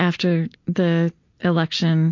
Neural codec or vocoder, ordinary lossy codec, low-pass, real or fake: none; MP3, 48 kbps; 7.2 kHz; real